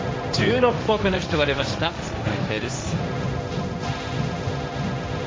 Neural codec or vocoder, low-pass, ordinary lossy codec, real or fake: codec, 16 kHz, 1.1 kbps, Voila-Tokenizer; none; none; fake